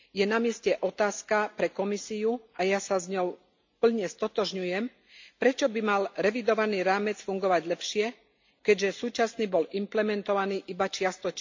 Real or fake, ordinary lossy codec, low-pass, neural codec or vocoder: real; MP3, 64 kbps; 7.2 kHz; none